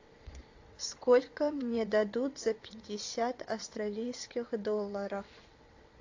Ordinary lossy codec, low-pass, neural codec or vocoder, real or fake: AAC, 48 kbps; 7.2 kHz; vocoder, 22.05 kHz, 80 mel bands, WaveNeXt; fake